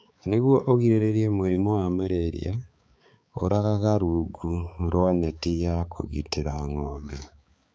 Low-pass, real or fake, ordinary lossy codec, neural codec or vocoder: none; fake; none; codec, 16 kHz, 4 kbps, X-Codec, HuBERT features, trained on balanced general audio